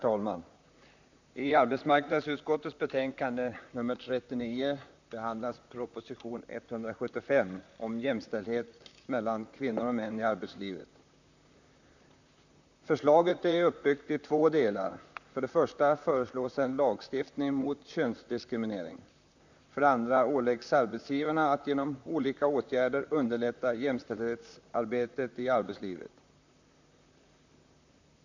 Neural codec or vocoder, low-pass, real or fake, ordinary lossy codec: vocoder, 44.1 kHz, 128 mel bands, Pupu-Vocoder; 7.2 kHz; fake; none